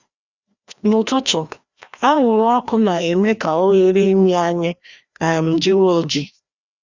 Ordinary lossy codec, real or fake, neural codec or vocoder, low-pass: Opus, 64 kbps; fake; codec, 16 kHz, 1 kbps, FreqCodec, larger model; 7.2 kHz